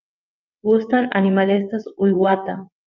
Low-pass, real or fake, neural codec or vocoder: 7.2 kHz; fake; vocoder, 22.05 kHz, 80 mel bands, WaveNeXt